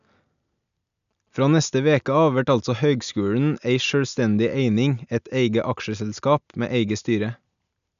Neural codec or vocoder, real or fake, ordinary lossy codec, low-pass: none; real; none; 7.2 kHz